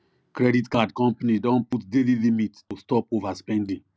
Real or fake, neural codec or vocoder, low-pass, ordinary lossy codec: real; none; none; none